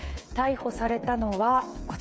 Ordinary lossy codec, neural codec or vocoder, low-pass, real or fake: none; codec, 16 kHz, 8 kbps, FreqCodec, smaller model; none; fake